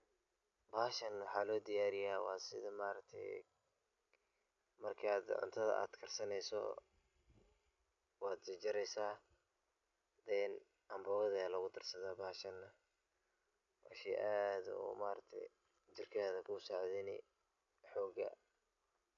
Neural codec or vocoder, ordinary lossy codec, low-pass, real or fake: none; AAC, 64 kbps; 7.2 kHz; real